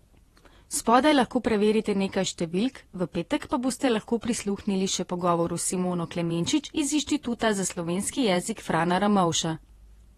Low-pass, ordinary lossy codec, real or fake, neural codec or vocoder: 19.8 kHz; AAC, 32 kbps; fake; vocoder, 48 kHz, 128 mel bands, Vocos